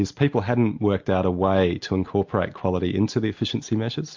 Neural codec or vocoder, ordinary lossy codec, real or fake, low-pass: none; MP3, 48 kbps; real; 7.2 kHz